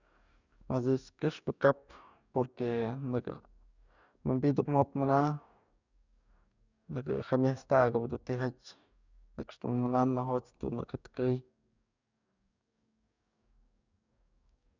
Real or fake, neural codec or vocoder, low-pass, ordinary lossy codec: fake; codec, 44.1 kHz, 2.6 kbps, DAC; 7.2 kHz; none